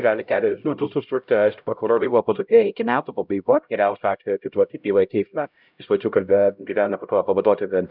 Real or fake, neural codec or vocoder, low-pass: fake; codec, 16 kHz, 0.5 kbps, X-Codec, HuBERT features, trained on LibriSpeech; 5.4 kHz